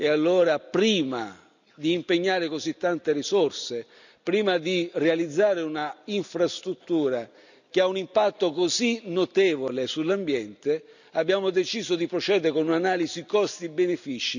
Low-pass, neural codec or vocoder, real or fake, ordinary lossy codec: 7.2 kHz; none; real; none